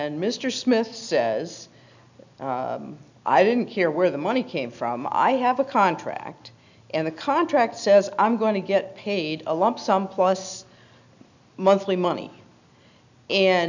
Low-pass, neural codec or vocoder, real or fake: 7.2 kHz; none; real